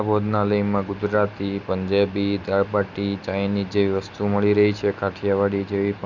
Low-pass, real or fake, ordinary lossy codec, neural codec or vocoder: 7.2 kHz; real; none; none